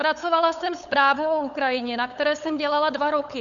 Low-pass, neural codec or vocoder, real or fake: 7.2 kHz; codec, 16 kHz, 8 kbps, FunCodec, trained on LibriTTS, 25 frames a second; fake